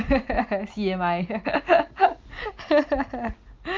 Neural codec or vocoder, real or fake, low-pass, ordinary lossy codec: none; real; 7.2 kHz; Opus, 24 kbps